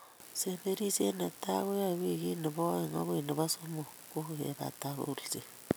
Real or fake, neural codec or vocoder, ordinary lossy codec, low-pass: real; none; none; none